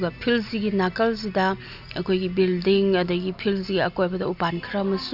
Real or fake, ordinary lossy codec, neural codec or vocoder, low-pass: real; none; none; 5.4 kHz